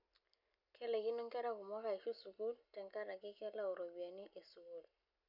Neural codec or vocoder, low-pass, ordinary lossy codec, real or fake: none; 5.4 kHz; none; real